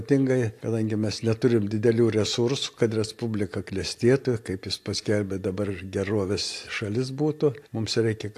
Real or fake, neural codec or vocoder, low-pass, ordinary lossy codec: real; none; 14.4 kHz; AAC, 64 kbps